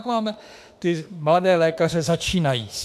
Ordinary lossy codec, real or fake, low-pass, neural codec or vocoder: AAC, 96 kbps; fake; 14.4 kHz; autoencoder, 48 kHz, 32 numbers a frame, DAC-VAE, trained on Japanese speech